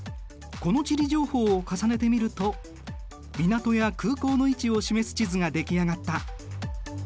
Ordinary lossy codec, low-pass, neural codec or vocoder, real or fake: none; none; none; real